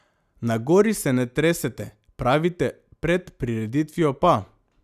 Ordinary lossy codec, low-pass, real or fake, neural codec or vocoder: none; 14.4 kHz; real; none